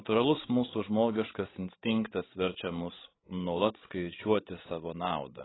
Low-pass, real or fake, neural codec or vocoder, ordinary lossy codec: 7.2 kHz; fake; codec, 16 kHz, 16 kbps, FreqCodec, larger model; AAC, 16 kbps